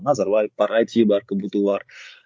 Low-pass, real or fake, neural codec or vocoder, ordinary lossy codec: none; fake; codec, 16 kHz, 16 kbps, FreqCodec, larger model; none